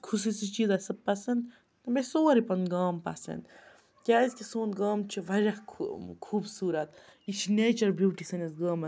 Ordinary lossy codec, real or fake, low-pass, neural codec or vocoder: none; real; none; none